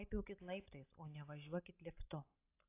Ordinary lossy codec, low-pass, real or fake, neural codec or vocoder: AAC, 24 kbps; 3.6 kHz; real; none